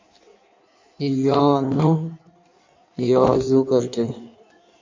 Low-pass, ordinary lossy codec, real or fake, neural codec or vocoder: 7.2 kHz; MP3, 48 kbps; fake; codec, 16 kHz in and 24 kHz out, 1.1 kbps, FireRedTTS-2 codec